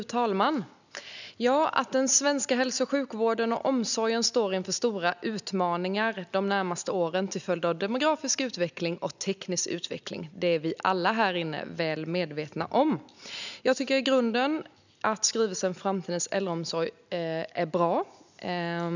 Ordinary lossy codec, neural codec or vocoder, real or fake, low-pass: none; none; real; 7.2 kHz